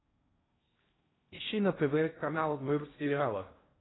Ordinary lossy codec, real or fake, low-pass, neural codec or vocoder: AAC, 16 kbps; fake; 7.2 kHz; codec, 16 kHz in and 24 kHz out, 0.6 kbps, FocalCodec, streaming, 4096 codes